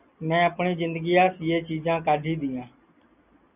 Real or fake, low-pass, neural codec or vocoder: real; 3.6 kHz; none